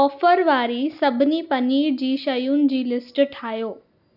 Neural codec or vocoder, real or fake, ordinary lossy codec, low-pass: none; real; none; 5.4 kHz